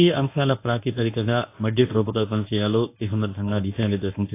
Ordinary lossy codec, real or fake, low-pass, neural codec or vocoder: AAC, 24 kbps; fake; 3.6 kHz; codec, 24 kHz, 0.9 kbps, WavTokenizer, medium speech release version 1